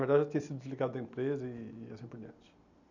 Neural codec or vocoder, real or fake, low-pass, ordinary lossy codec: none; real; 7.2 kHz; none